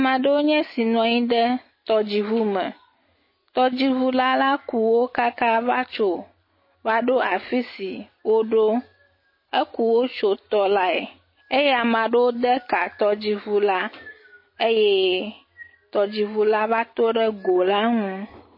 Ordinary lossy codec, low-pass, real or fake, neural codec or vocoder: MP3, 24 kbps; 5.4 kHz; real; none